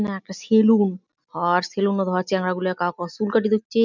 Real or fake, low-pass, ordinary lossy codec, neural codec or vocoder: real; 7.2 kHz; none; none